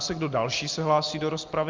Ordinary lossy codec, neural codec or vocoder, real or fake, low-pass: Opus, 24 kbps; none; real; 7.2 kHz